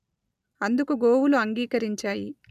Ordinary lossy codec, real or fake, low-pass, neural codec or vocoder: none; real; 14.4 kHz; none